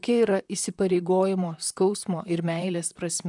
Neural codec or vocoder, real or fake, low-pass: vocoder, 44.1 kHz, 128 mel bands, Pupu-Vocoder; fake; 10.8 kHz